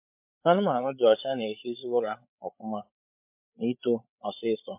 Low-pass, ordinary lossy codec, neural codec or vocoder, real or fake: 3.6 kHz; MP3, 32 kbps; codec, 16 kHz, 8 kbps, FreqCodec, larger model; fake